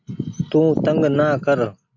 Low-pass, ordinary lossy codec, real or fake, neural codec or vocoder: 7.2 kHz; AAC, 48 kbps; real; none